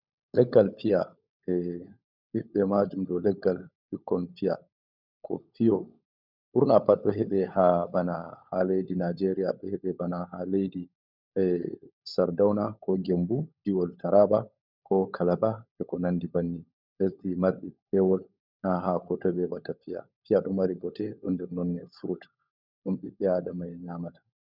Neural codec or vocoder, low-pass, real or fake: codec, 16 kHz, 16 kbps, FunCodec, trained on LibriTTS, 50 frames a second; 5.4 kHz; fake